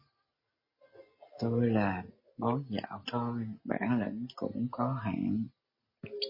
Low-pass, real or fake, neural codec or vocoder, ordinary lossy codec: 5.4 kHz; real; none; MP3, 24 kbps